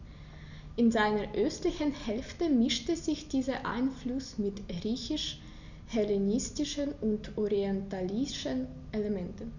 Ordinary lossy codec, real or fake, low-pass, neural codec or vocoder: none; real; 7.2 kHz; none